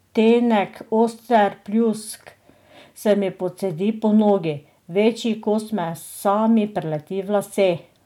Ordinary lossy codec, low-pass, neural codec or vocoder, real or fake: none; 19.8 kHz; none; real